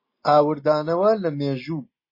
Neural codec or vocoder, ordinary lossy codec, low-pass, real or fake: none; MP3, 24 kbps; 5.4 kHz; real